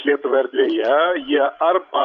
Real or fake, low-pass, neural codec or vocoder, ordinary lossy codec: fake; 7.2 kHz; codec, 16 kHz, 16 kbps, FreqCodec, larger model; Opus, 64 kbps